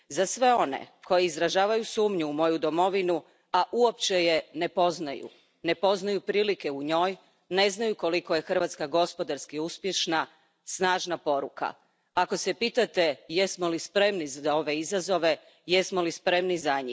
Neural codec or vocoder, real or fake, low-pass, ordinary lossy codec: none; real; none; none